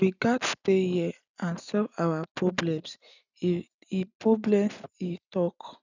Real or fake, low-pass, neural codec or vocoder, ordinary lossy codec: real; 7.2 kHz; none; none